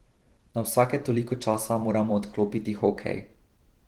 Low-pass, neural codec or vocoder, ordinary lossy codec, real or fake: 19.8 kHz; vocoder, 44.1 kHz, 128 mel bands every 512 samples, BigVGAN v2; Opus, 16 kbps; fake